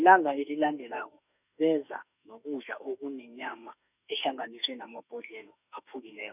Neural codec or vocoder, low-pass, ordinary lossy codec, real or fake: autoencoder, 48 kHz, 32 numbers a frame, DAC-VAE, trained on Japanese speech; 3.6 kHz; none; fake